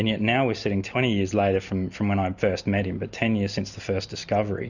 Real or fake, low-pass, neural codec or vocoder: real; 7.2 kHz; none